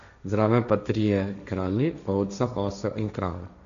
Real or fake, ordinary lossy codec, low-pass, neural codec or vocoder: fake; none; 7.2 kHz; codec, 16 kHz, 1.1 kbps, Voila-Tokenizer